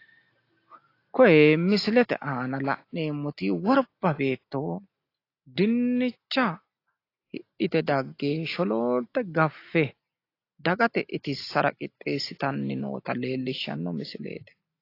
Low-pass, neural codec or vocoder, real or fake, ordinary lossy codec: 5.4 kHz; none; real; AAC, 32 kbps